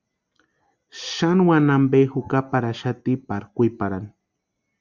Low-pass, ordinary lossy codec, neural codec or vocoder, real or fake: 7.2 kHz; Opus, 64 kbps; none; real